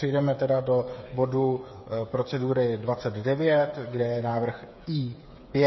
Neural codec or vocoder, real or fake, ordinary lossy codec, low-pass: codec, 16 kHz, 8 kbps, FreqCodec, smaller model; fake; MP3, 24 kbps; 7.2 kHz